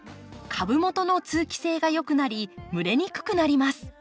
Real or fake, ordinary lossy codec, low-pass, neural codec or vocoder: real; none; none; none